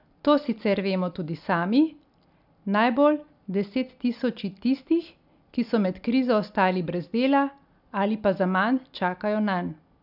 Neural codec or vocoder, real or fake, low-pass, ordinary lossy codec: none; real; 5.4 kHz; none